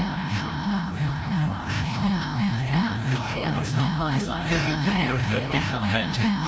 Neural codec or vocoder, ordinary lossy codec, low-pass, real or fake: codec, 16 kHz, 0.5 kbps, FreqCodec, larger model; none; none; fake